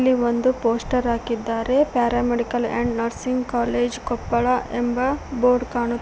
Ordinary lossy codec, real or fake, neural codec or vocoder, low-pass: none; real; none; none